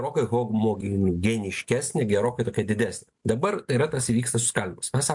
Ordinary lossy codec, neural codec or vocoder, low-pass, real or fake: MP3, 64 kbps; none; 10.8 kHz; real